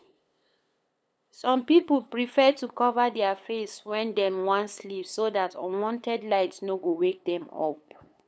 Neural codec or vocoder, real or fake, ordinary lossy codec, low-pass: codec, 16 kHz, 8 kbps, FunCodec, trained on LibriTTS, 25 frames a second; fake; none; none